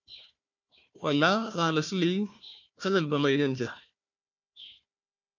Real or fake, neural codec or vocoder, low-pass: fake; codec, 16 kHz, 1 kbps, FunCodec, trained on Chinese and English, 50 frames a second; 7.2 kHz